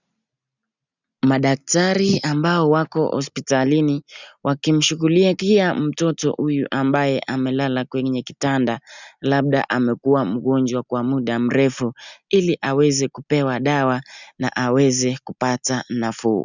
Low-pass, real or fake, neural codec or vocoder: 7.2 kHz; real; none